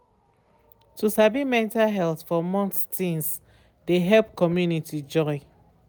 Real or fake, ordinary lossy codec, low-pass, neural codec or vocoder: real; none; none; none